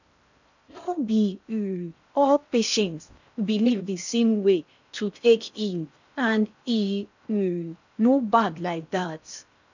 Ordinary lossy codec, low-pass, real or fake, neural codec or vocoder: none; 7.2 kHz; fake; codec, 16 kHz in and 24 kHz out, 0.6 kbps, FocalCodec, streaming, 4096 codes